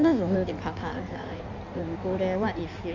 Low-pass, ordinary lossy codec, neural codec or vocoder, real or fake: 7.2 kHz; none; codec, 16 kHz in and 24 kHz out, 1.1 kbps, FireRedTTS-2 codec; fake